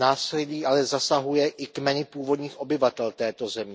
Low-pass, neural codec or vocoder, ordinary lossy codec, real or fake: none; none; none; real